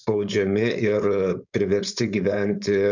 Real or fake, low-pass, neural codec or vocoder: fake; 7.2 kHz; codec, 16 kHz, 4.8 kbps, FACodec